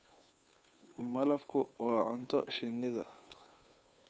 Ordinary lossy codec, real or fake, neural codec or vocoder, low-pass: none; fake; codec, 16 kHz, 2 kbps, FunCodec, trained on Chinese and English, 25 frames a second; none